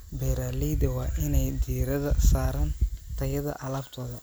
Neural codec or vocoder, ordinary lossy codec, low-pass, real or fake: none; none; none; real